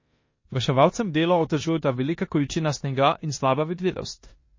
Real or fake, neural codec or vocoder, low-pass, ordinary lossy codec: fake; codec, 16 kHz in and 24 kHz out, 0.9 kbps, LongCat-Audio-Codec, four codebook decoder; 7.2 kHz; MP3, 32 kbps